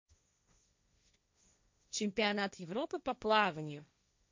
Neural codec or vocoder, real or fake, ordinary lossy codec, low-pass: codec, 16 kHz, 1.1 kbps, Voila-Tokenizer; fake; MP3, 48 kbps; 7.2 kHz